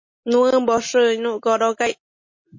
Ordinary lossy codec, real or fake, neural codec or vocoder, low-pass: MP3, 32 kbps; real; none; 7.2 kHz